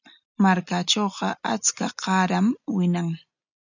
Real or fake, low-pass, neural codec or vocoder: real; 7.2 kHz; none